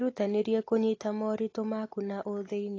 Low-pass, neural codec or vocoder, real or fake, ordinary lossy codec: 7.2 kHz; none; real; AAC, 32 kbps